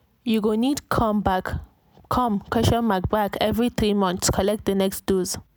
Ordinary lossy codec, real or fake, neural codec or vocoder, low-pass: none; real; none; none